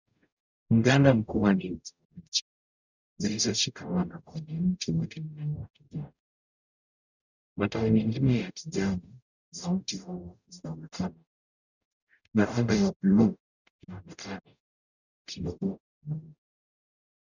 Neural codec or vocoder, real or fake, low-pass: codec, 44.1 kHz, 0.9 kbps, DAC; fake; 7.2 kHz